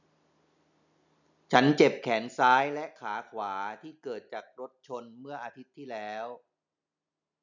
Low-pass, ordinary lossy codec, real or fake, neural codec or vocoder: 7.2 kHz; none; real; none